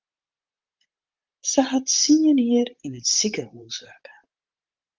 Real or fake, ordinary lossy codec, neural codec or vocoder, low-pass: fake; Opus, 24 kbps; vocoder, 44.1 kHz, 128 mel bands, Pupu-Vocoder; 7.2 kHz